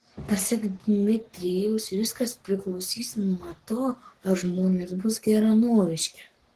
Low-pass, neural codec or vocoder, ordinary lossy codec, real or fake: 14.4 kHz; codec, 44.1 kHz, 3.4 kbps, Pupu-Codec; Opus, 16 kbps; fake